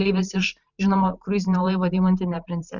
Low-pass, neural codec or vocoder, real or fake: 7.2 kHz; vocoder, 44.1 kHz, 128 mel bands every 512 samples, BigVGAN v2; fake